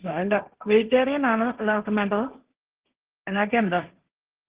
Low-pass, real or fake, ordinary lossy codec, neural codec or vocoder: 3.6 kHz; fake; Opus, 16 kbps; codec, 16 kHz, 1.1 kbps, Voila-Tokenizer